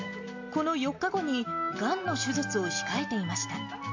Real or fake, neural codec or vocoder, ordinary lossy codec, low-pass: real; none; AAC, 48 kbps; 7.2 kHz